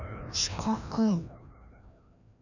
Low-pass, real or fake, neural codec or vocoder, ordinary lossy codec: 7.2 kHz; fake; codec, 16 kHz, 1 kbps, FreqCodec, larger model; none